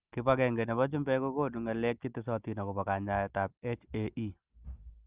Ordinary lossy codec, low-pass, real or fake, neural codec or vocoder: Opus, 24 kbps; 3.6 kHz; real; none